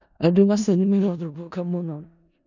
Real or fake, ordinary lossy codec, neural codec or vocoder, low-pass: fake; none; codec, 16 kHz in and 24 kHz out, 0.4 kbps, LongCat-Audio-Codec, four codebook decoder; 7.2 kHz